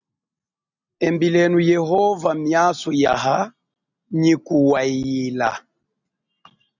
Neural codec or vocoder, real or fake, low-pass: none; real; 7.2 kHz